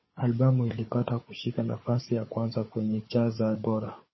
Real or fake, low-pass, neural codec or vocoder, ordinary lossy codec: fake; 7.2 kHz; codec, 16 kHz, 4 kbps, FunCodec, trained on Chinese and English, 50 frames a second; MP3, 24 kbps